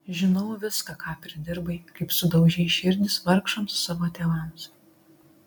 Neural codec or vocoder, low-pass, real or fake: none; 19.8 kHz; real